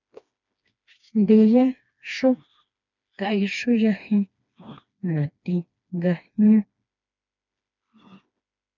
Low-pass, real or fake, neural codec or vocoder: 7.2 kHz; fake; codec, 16 kHz, 2 kbps, FreqCodec, smaller model